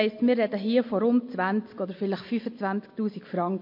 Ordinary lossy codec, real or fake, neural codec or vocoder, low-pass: MP3, 32 kbps; real; none; 5.4 kHz